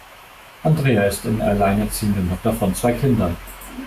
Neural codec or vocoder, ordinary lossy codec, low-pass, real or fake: autoencoder, 48 kHz, 128 numbers a frame, DAC-VAE, trained on Japanese speech; Opus, 64 kbps; 14.4 kHz; fake